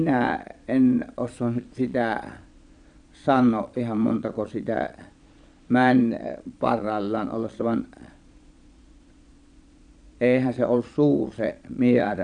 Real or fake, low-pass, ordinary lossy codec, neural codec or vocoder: fake; 9.9 kHz; none; vocoder, 22.05 kHz, 80 mel bands, Vocos